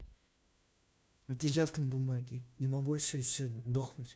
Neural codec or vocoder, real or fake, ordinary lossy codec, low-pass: codec, 16 kHz, 1 kbps, FunCodec, trained on LibriTTS, 50 frames a second; fake; none; none